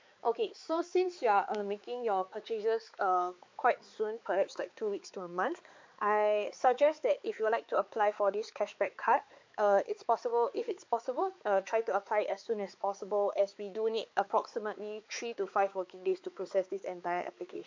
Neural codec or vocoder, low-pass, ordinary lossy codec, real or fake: codec, 16 kHz, 4 kbps, X-Codec, HuBERT features, trained on balanced general audio; 7.2 kHz; MP3, 48 kbps; fake